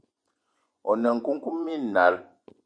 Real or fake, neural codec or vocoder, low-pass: fake; vocoder, 24 kHz, 100 mel bands, Vocos; 9.9 kHz